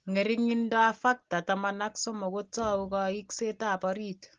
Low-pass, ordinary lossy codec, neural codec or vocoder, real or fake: 7.2 kHz; Opus, 16 kbps; none; real